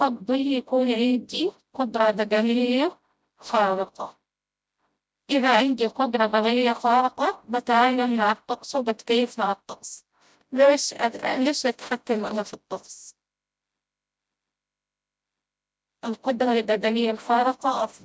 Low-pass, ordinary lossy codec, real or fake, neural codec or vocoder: none; none; fake; codec, 16 kHz, 0.5 kbps, FreqCodec, smaller model